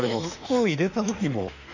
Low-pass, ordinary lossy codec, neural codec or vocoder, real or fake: 7.2 kHz; none; autoencoder, 48 kHz, 32 numbers a frame, DAC-VAE, trained on Japanese speech; fake